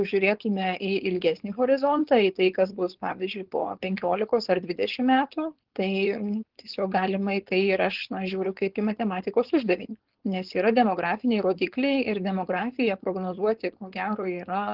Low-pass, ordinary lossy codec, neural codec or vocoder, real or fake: 5.4 kHz; Opus, 16 kbps; codec, 16 kHz, 4.8 kbps, FACodec; fake